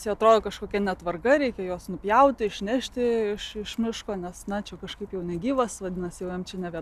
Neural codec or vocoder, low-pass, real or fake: none; 14.4 kHz; real